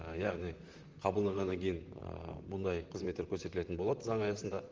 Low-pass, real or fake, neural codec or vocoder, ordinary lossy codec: 7.2 kHz; fake; vocoder, 44.1 kHz, 128 mel bands, Pupu-Vocoder; Opus, 32 kbps